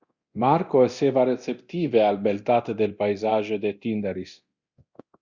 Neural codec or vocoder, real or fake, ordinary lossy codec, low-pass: codec, 24 kHz, 0.9 kbps, DualCodec; fake; Opus, 64 kbps; 7.2 kHz